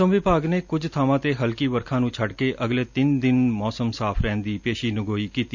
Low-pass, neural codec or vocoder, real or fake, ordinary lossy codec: 7.2 kHz; none; real; none